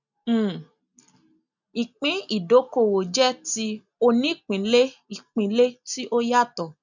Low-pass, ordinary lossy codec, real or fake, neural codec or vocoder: 7.2 kHz; none; real; none